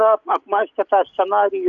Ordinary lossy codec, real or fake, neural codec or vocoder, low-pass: AAC, 64 kbps; real; none; 7.2 kHz